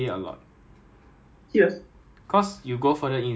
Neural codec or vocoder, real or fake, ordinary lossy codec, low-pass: none; real; none; none